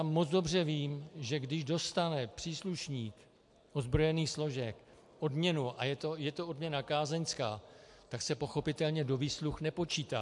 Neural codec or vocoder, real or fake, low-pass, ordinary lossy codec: none; real; 10.8 kHz; MP3, 64 kbps